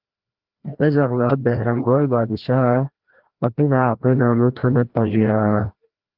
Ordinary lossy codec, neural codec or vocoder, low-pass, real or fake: Opus, 16 kbps; codec, 16 kHz, 1 kbps, FreqCodec, larger model; 5.4 kHz; fake